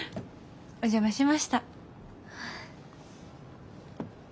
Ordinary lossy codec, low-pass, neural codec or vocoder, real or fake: none; none; none; real